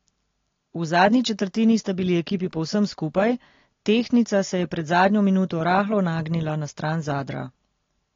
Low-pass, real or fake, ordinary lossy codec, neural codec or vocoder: 7.2 kHz; real; AAC, 32 kbps; none